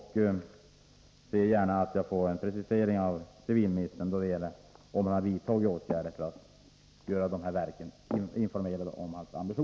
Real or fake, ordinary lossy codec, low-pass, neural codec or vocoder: real; none; none; none